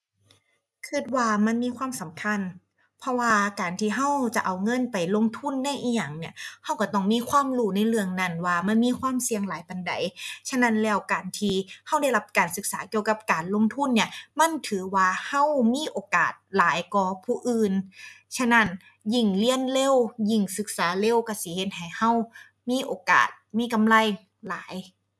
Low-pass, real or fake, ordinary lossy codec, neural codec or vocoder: none; real; none; none